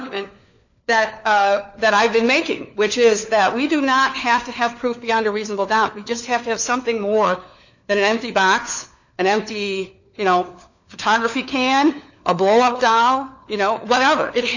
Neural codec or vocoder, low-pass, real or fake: codec, 16 kHz, 4 kbps, FunCodec, trained on LibriTTS, 50 frames a second; 7.2 kHz; fake